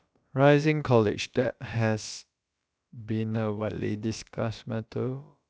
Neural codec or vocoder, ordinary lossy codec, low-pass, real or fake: codec, 16 kHz, about 1 kbps, DyCAST, with the encoder's durations; none; none; fake